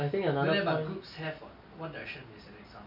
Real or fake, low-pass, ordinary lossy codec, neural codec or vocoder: real; 5.4 kHz; none; none